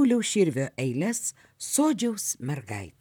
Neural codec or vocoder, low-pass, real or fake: vocoder, 44.1 kHz, 128 mel bands, Pupu-Vocoder; 19.8 kHz; fake